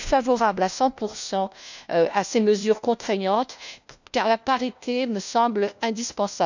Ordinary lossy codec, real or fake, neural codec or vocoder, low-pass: none; fake; codec, 16 kHz, 1 kbps, FunCodec, trained on LibriTTS, 50 frames a second; 7.2 kHz